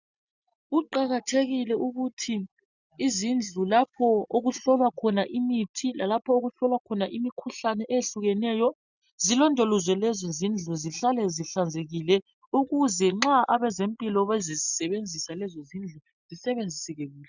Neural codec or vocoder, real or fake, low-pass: none; real; 7.2 kHz